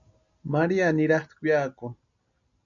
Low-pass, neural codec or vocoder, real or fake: 7.2 kHz; none; real